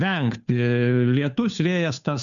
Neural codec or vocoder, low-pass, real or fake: codec, 16 kHz, 2 kbps, FunCodec, trained on Chinese and English, 25 frames a second; 7.2 kHz; fake